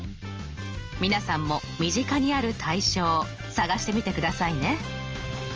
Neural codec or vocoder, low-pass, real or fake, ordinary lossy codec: none; 7.2 kHz; real; Opus, 24 kbps